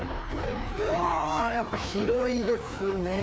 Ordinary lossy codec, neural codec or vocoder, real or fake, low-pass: none; codec, 16 kHz, 2 kbps, FreqCodec, larger model; fake; none